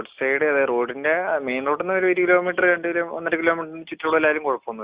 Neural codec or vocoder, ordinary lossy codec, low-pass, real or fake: none; Opus, 64 kbps; 3.6 kHz; real